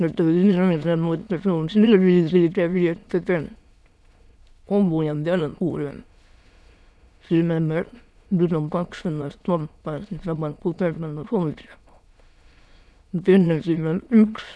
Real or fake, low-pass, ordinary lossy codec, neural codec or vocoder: fake; none; none; autoencoder, 22.05 kHz, a latent of 192 numbers a frame, VITS, trained on many speakers